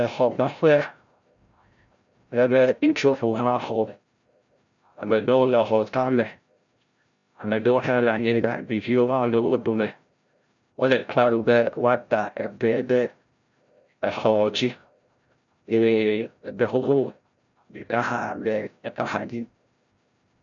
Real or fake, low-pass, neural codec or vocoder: fake; 7.2 kHz; codec, 16 kHz, 0.5 kbps, FreqCodec, larger model